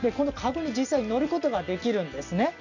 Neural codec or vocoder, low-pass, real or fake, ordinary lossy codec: none; 7.2 kHz; real; none